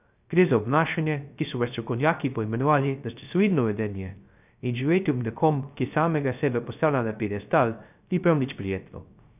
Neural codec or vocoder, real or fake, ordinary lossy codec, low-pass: codec, 16 kHz, 0.3 kbps, FocalCodec; fake; none; 3.6 kHz